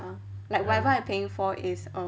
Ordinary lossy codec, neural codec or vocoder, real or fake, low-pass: none; none; real; none